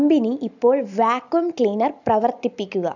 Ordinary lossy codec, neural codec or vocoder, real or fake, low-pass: none; none; real; 7.2 kHz